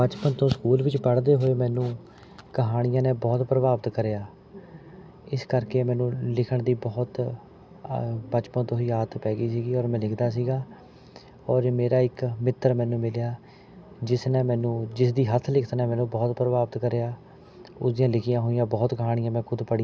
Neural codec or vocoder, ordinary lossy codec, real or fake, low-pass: none; none; real; none